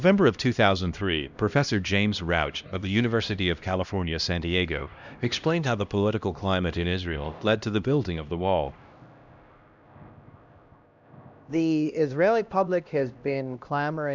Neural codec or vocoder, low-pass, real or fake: codec, 16 kHz, 1 kbps, X-Codec, HuBERT features, trained on LibriSpeech; 7.2 kHz; fake